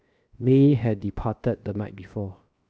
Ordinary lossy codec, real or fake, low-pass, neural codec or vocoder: none; fake; none; codec, 16 kHz, 0.7 kbps, FocalCodec